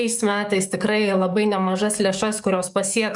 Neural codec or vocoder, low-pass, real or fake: codec, 44.1 kHz, 7.8 kbps, DAC; 10.8 kHz; fake